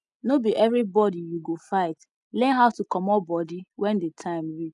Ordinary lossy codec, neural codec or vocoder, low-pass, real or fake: none; none; 10.8 kHz; real